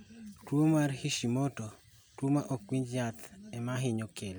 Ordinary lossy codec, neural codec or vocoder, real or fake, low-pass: none; none; real; none